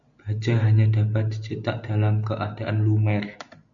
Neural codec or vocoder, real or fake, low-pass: none; real; 7.2 kHz